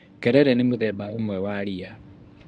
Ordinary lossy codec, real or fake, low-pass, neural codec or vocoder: none; fake; 9.9 kHz; codec, 24 kHz, 0.9 kbps, WavTokenizer, medium speech release version 1